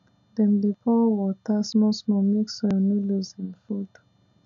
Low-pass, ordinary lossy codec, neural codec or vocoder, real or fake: 7.2 kHz; none; none; real